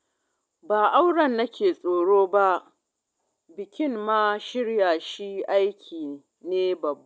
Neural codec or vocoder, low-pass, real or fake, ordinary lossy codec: none; none; real; none